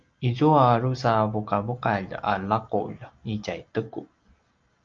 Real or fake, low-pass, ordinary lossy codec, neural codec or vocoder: real; 7.2 kHz; Opus, 24 kbps; none